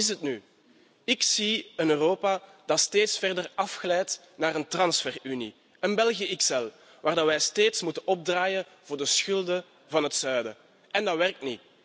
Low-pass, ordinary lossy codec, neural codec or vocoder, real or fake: none; none; none; real